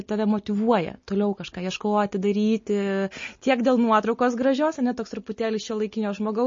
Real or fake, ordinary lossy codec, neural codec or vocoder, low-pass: real; MP3, 32 kbps; none; 7.2 kHz